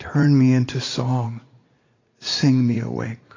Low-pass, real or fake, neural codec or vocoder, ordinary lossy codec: 7.2 kHz; fake; vocoder, 44.1 kHz, 80 mel bands, Vocos; AAC, 32 kbps